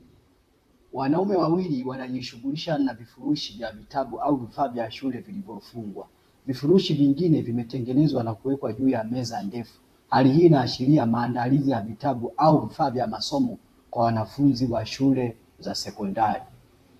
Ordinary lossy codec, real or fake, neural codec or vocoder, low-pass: AAC, 64 kbps; fake; vocoder, 44.1 kHz, 128 mel bands, Pupu-Vocoder; 14.4 kHz